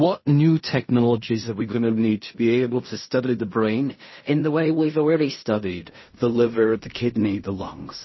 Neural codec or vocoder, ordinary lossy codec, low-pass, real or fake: codec, 16 kHz in and 24 kHz out, 0.4 kbps, LongCat-Audio-Codec, fine tuned four codebook decoder; MP3, 24 kbps; 7.2 kHz; fake